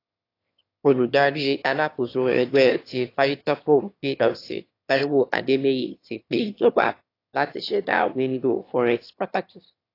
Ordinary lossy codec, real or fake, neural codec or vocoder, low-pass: AAC, 32 kbps; fake; autoencoder, 22.05 kHz, a latent of 192 numbers a frame, VITS, trained on one speaker; 5.4 kHz